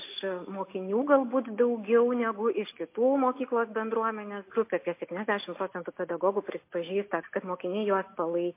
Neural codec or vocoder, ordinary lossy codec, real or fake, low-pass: none; MP3, 24 kbps; real; 3.6 kHz